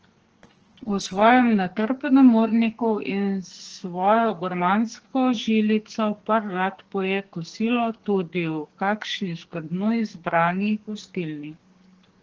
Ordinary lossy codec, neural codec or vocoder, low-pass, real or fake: Opus, 16 kbps; codec, 44.1 kHz, 2.6 kbps, SNAC; 7.2 kHz; fake